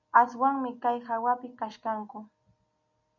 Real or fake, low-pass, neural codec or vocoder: real; 7.2 kHz; none